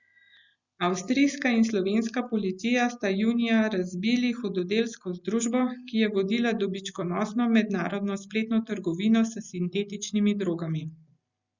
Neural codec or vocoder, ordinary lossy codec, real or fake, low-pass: none; Opus, 64 kbps; real; 7.2 kHz